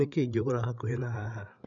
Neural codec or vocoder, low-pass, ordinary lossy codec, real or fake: codec, 16 kHz, 8 kbps, FreqCodec, larger model; 7.2 kHz; none; fake